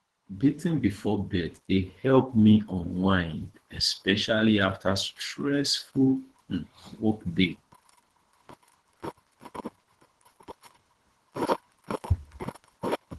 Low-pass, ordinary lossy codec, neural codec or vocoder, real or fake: 10.8 kHz; Opus, 16 kbps; codec, 24 kHz, 3 kbps, HILCodec; fake